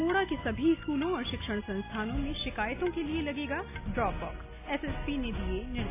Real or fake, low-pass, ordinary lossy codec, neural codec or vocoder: real; 3.6 kHz; AAC, 24 kbps; none